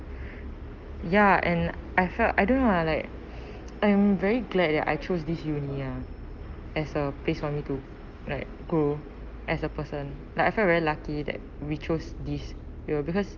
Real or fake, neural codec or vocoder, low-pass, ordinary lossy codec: real; none; 7.2 kHz; Opus, 32 kbps